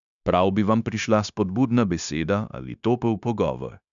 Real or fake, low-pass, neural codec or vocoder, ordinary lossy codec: fake; 7.2 kHz; codec, 16 kHz, 0.9 kbps, LongCat-Audio-Codec; none